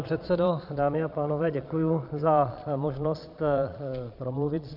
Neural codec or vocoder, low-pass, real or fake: vocoder, 22.05 kHz, 80 mel bands, Vocos; 5.4 kHz; fake